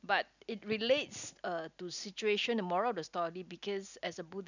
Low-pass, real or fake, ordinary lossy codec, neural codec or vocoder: 7.2 kHz; real; none; none